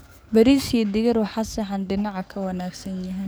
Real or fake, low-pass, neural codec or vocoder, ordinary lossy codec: fake; none; codec, 44.1 kHz, 7.8 kbps, DAC; none